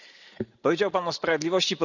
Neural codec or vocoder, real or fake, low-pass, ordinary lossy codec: none; real; 7.2 kHz; none